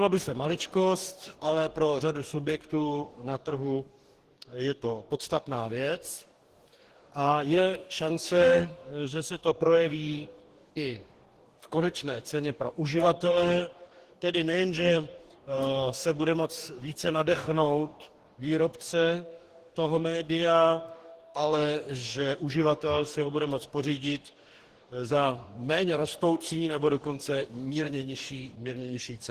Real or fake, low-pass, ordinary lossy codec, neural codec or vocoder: fake; 14.4 kHz; Opus, 16 kbps; codec, 44.1 kHz, 2.6 kbps, DAC